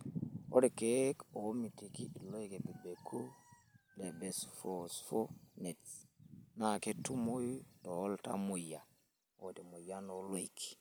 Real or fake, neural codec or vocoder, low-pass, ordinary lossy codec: real; none; none; none